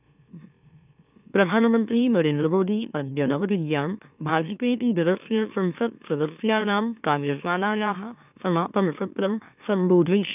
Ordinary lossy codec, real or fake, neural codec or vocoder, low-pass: none; fake; autoencoder, 44.1 kHz, a latent of 192 numbers a frame, MeloTTS; 3.6 kHz